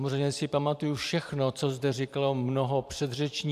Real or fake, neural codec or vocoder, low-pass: fake; vocoder, 44.1 kHz, 128 mel bands every 512 samples, BigVGAN v2; 14.4 kHz